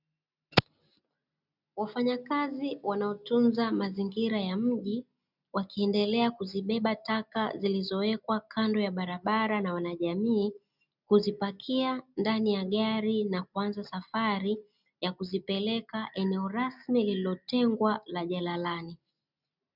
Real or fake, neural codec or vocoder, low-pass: real; none; 5.4 kHz